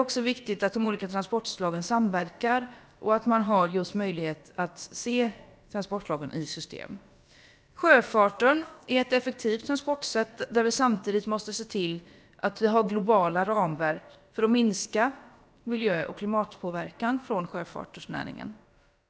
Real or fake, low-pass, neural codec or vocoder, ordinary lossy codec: fake; none; codec, 16 kHz, about 1 kbps, DyCAST, with the encoder's durations; none